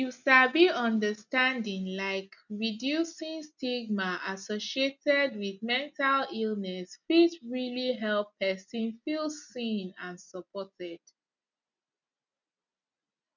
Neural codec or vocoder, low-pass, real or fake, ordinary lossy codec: none; 7.2 kHz; real; none